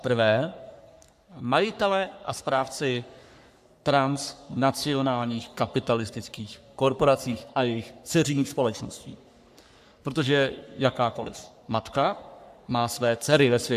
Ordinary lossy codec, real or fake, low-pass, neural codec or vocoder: AAC, 96 kbps; fake; 14.4 kHz; codec, 44.1 kHz, 3.4 kbps, Pupu-Codec